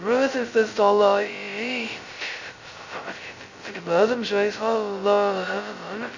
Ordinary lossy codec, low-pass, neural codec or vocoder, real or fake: Opus, 64 kbps; 7.2 kHz; codec, 16 kHz, 0.2 kbps, FocalCodec; fake